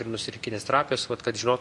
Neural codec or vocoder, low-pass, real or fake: none; 10.8 kHz; real